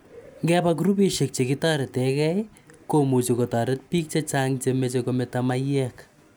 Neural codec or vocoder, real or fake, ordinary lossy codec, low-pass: none; real; none; none